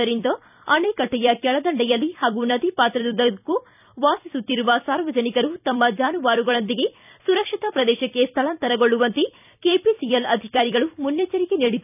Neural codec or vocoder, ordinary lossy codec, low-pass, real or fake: vocoder, 44.1 kHz, 128 mel bands every 256 samples, BigVGAN v2; none; 3.6 kHz; fake